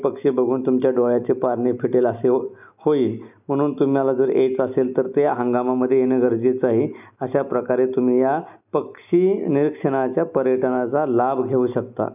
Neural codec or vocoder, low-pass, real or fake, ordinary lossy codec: none; 3.6 kHz; real; none